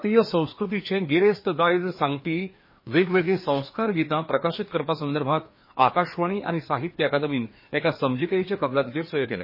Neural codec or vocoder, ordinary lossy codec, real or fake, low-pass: codec, 16 kHz, 2 kbps, FreqCodec, larger model; MP3, 24 kbps; fake; 5.4 kHz